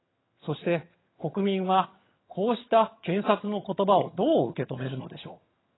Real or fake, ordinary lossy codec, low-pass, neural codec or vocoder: fake; AAC, 16 kbps; 7.2 kHz; vocoder, 22.05 kHz, 80 mel bands, HiFi-GAN